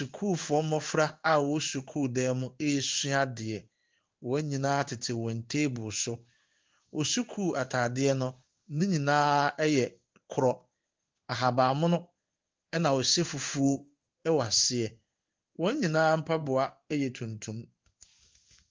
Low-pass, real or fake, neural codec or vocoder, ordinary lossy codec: 7.2 kHz; fake; codec, 16 kHz in and 24 kHz out, 1 kbps, XY-Tokenizer; Opus, 32 kbps